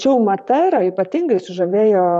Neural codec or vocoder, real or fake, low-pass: vocoder, 44.1 kHz, 128 mel bands, Pupu-Vocoder; fake; 10.8 kHz